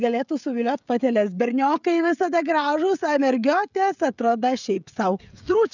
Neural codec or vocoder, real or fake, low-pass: codec, 16 kHz, 16 kbps, FreqCodec, smaller model; fake; 7.2 kHz